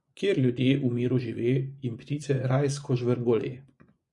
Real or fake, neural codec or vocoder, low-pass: fake; vocoder, 24 kHz, 100 mel bands, Vocos; 10.8 kHz